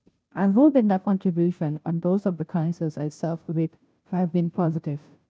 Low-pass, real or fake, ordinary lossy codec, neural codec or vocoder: none; fake; none; codec, 16 kHz, 0.5 kbps, FunCodec, trained on Chinese and English, 25 frames a second